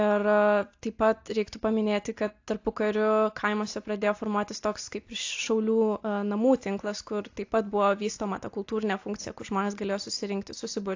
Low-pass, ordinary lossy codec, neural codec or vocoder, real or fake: 7.2 kHz; AAC, 48 kbps; none; real